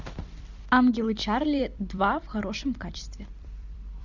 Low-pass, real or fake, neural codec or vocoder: 7.2 kHz; fake; vocoder, 44.1 kHz, 128 mel bands every 256 samples, BigVGAN v2